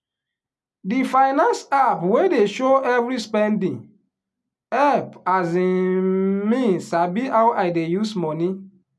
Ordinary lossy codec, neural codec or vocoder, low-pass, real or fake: none; none; none; real